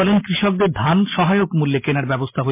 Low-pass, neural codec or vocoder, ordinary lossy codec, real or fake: 3.6 kHz; none; MP3, 24 kbps; real